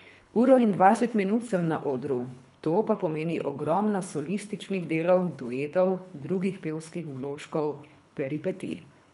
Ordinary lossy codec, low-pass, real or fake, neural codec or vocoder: none; 10.8 kHz; fake; codec, 24 kHz, 3 kbps, HILCodec